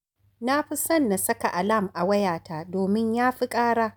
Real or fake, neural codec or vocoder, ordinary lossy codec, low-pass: real; none; none; none